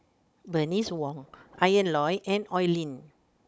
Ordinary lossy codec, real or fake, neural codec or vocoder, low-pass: none; fake; codec, 16 kHz, 16 kbps, FunCodec, trained on Chinese and English, 50 frames a second; none